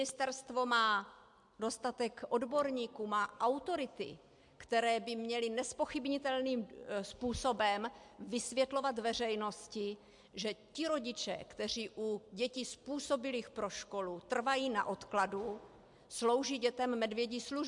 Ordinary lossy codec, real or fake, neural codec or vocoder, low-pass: MP3, 64 kbps; real; none; 10.8 kHz